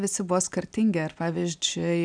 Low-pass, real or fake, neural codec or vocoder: 9.9 kHz; real; none